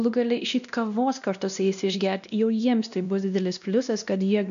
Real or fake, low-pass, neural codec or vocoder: fake; 7.2 kHz; codec, 16 kHz, 1 kbps, X-Codec, WavLM features, trained on Multilingual LibriSpeech